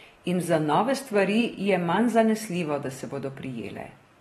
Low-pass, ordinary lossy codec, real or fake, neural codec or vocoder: 19.8 kHz; AAC, 32 kbps; real; none